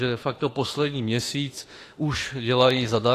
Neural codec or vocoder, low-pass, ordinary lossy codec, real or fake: autoencoder, 48 kHz, 32 numbers a frame, DAC-VAE, trained on Japanese speech; 14.4 kHz; AAC, 48 kbps; fake